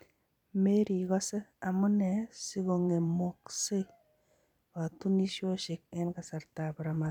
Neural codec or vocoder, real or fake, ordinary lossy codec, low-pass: vocoder, 44.1 kHz, 128 mel bands every 256 samples, BigVGAN v2; fake; none; 19.8 kHz